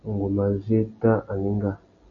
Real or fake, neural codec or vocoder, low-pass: real; none; 7.2 kHz